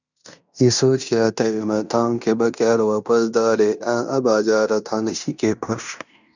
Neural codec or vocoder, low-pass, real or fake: codec, 16 kHz in and 24 kHz out, 0.9 kbps, LongCat-Audio-Codec, fine tuned four codebook decoder; 7.2 kHz; fake